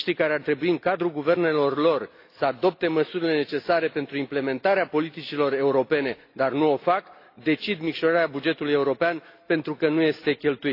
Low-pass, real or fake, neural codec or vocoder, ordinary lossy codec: 5.4 kHz; real; none; AAC, 32 kbps